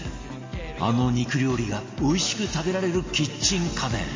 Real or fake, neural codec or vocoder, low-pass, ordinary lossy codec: real; none; 7.2 kHz; MP3, 32 kbps